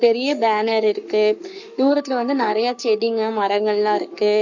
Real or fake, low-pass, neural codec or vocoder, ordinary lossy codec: fake; 7.2 kHz; codec, 44.1 kHz, 7.8 kbps, Pupu-Codec; none